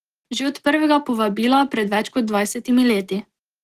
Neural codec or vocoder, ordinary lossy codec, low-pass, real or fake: none; Opus, 16 kbps; 14.4 kHz; real